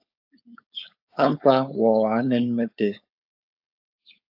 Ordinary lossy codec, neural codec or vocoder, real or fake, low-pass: AAC, 48 kbps; codec, 16 kHz, 4.8 kbps, FACodec; fake; 5.4 kHz